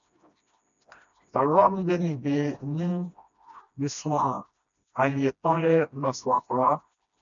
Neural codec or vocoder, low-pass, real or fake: codec, 16 kHz, 1 kbps, FreqCodec, smaller model; 7.2 kHz; fake